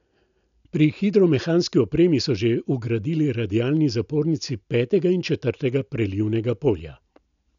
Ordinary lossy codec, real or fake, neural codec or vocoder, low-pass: none; real; none; 7.2 kHz